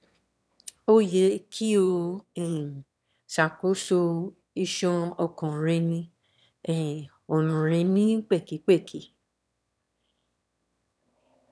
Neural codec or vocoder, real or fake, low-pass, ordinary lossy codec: autoencoder, 22.05 kHz, a latent of 192 numbers a frame, VITS, trained on one speaker; fake; none; none